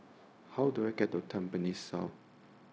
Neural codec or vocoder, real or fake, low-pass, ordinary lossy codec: codec, 16 kHz, 0.4 kbps, LongCat-Audio-Codec; fake; none; none